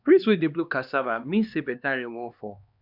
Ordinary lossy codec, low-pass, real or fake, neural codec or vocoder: none; 5.4 kHz; fake; codec, 16 kHz, 2 kbps, X-Codec, HuBERT features, trained on LibriSpeech